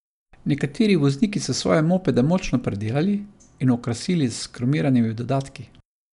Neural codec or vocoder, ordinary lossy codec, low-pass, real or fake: none; none; 10.8 kHz; real